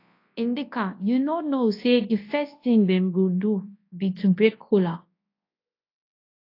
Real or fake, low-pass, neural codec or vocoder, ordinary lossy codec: fake; 5.4 kHz; codec, 24 kHz, 0.9 kbps, WavTokenizer, large speech release; AAC, 32 kbps